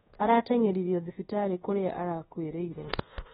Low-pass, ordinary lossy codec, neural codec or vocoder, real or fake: 10.8 kHz; AAC, 16 kbps; codec, 24 kHz, 1.2 kbps, DualCodec; fake